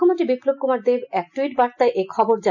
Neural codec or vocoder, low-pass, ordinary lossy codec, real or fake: none; 7.2 kHz; none; real